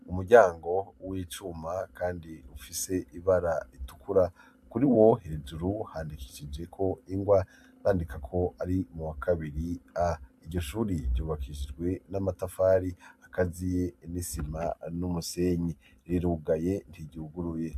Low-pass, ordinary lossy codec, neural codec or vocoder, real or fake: 14.4 kHz; AAC, 96 kbps; none; real